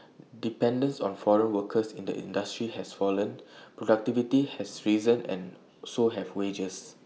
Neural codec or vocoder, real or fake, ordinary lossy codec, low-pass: none; real; none; none